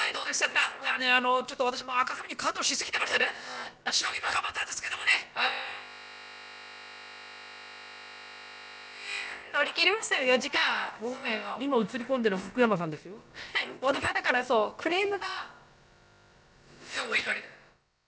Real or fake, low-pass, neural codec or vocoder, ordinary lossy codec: fake; none; codec, 16 kHz, about 1 kbps, DyCAST, with the encoder's durations; none